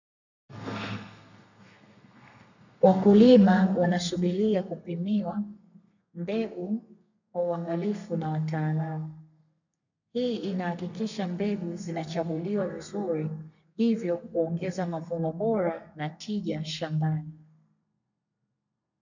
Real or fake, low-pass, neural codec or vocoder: fake; 7.2 kHz; codec, 32 kHz, 1.9 kbps, SNAC